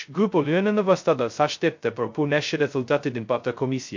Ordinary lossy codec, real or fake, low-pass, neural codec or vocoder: MP3, 48 kbps; fake; 7.2 kHz; codec, 16 kHz, 0.2 kbps, FocalCodec